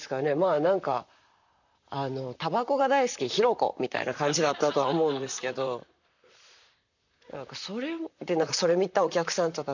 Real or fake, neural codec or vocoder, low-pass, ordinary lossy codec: fake; vocoder, 44.1 kHz, 128 mel bands, Pupu-Vocoder; 7.2 kHz; none